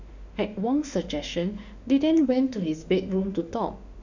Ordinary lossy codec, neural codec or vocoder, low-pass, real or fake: none; autoencoder, 48 kHz, 32 numbers a frame, DAC-VAE, trained on Japanese speech; 7.2 kHz; fake